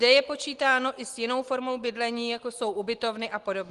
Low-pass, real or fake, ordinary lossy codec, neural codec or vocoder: 10.8 kHz; real; Opus, 24 kbps; none